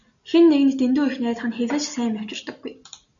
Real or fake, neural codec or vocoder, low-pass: real; none; 7.2 kHz